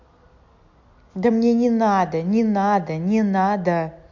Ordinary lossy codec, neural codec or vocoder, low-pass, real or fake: MP3, 48 kbps; none; 7.2 kHz; real